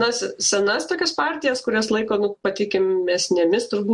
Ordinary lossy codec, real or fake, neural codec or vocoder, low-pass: MP3, 64 kbps; real; none; 10.8 kHz